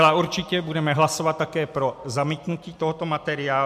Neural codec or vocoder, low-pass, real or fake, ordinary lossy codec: none; 14.4 kHz; real; MP3, 96 kbps